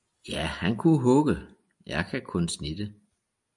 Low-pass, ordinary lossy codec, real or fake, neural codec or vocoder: 10.8 kHz; MP3, 96 kbps; real; none